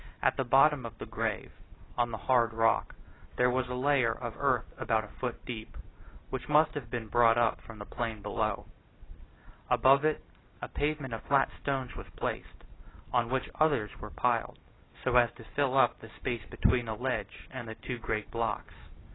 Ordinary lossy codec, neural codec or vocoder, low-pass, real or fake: AAC, 16 kbps; none; 7.2 kHz; real